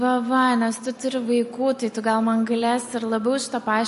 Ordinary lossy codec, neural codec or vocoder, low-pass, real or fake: MP3, 48 kbps; none; 14.4 kHz; real